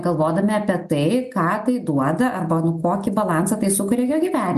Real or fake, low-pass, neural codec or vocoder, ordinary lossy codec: real; 14.4 kHz; none; MP3, 64 kbps